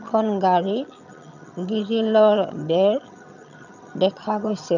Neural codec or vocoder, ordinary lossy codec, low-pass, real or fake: vocoder, 22.05 kHz, 80 mel bands, HiFi-GAN; none; 7.2 kHz; fake